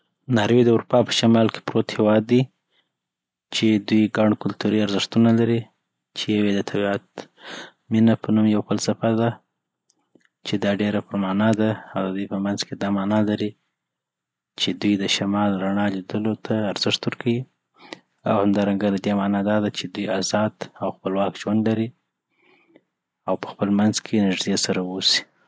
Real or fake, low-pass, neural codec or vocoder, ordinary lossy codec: real; none; none; none